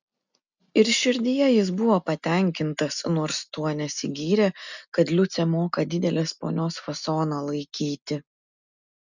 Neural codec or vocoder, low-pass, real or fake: none; 7.2 kHz; real